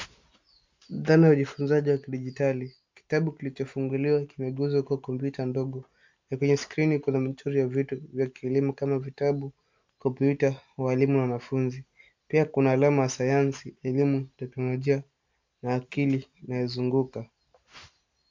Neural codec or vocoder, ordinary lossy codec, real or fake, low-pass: none; MP3, 64 kbps; real; 7.2 kHz